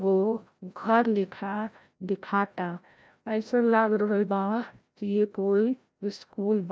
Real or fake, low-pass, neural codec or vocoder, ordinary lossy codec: fake; none; codec, 16 kHz, 0.5 kbps, FreqCodec, larger model; none